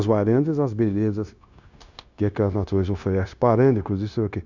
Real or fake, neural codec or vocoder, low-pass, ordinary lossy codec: fake; codec, 16 kHz, 0.9 kbps, LongCat-Audio-Codec; 7.2 kHz; none